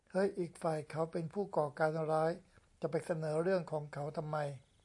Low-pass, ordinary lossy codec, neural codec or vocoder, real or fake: 10.8 kHz; AAC, 64 kbps; none; real